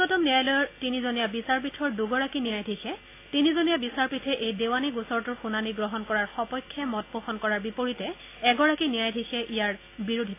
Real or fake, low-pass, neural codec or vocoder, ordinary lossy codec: real; 3.6 kHz; none; AAC, 24 kbps